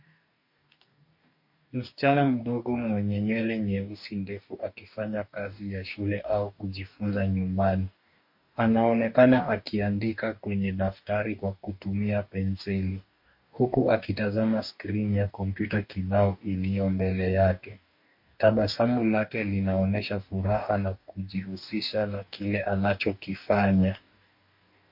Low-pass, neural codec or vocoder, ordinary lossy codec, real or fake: 5.4 kHz; codec, 44.1 kHz, 2.6 kbps, DAC; MP3, 32 kbps; fake